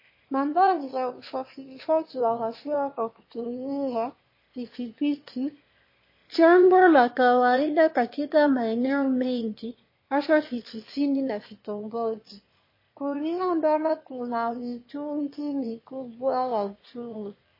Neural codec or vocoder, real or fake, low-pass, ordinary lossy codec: autoencoder, 22.05 kHz, a latent of 192 numbers a frame, VITS, trained on one speaker; fake; 5.4 kHz; MP3, 24 kbps